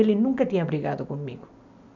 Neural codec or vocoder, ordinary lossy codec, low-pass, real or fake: none; none; 7.2 kHz; real